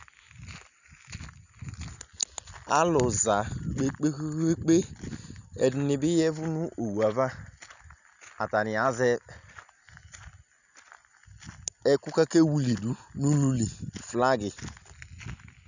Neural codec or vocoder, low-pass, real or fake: none; 7.2 kHz; real